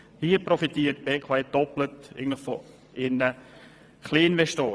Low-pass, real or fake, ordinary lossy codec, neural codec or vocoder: none; fake; none; vocoder, 22.05 kHz, 80 mel bands, WaveNeXt